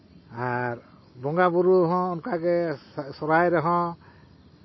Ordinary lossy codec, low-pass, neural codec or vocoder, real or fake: MP3, 24 kbps; 7.2 kHz; none; real